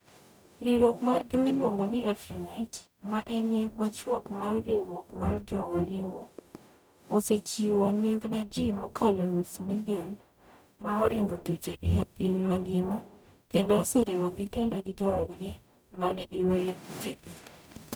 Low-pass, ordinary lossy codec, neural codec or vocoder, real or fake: none; none; codec, 44.1 kHz, 0.9 kbps, DAC; fake